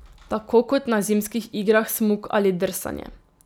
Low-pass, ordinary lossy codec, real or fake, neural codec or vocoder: none; none; real; none